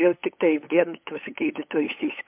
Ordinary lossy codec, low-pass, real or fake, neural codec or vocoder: MP3, 32 kbps; 3.6 kHz; fake; codec, 16 kHz, 8 kbps, FunCodec, trained on LibriTTS, 25 frames a second